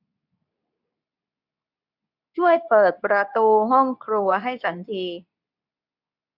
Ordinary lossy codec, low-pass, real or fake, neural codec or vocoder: none; 5.4 kHz; fake; codec, 24 kHz, 0.9 kbps, WavTokenizer, medium speech release version 2